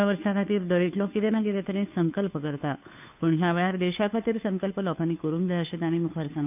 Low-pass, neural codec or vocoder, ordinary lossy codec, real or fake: 3.6 kHz; codec, 16 kHz, 2 kbps, FunCodec, trained on Chinese and English, 25 frames a second; none; fake